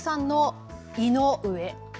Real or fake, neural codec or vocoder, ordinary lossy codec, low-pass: real; none; none; none